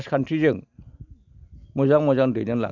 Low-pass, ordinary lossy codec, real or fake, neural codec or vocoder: 7.2 kHz; none; real; none